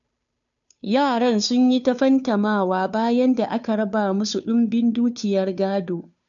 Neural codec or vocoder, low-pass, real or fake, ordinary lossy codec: codec, 16 kHz, 2 kbps, FunCodec, trained on Chinese and English, 25 frames a second; 7.2 kHz; fake; none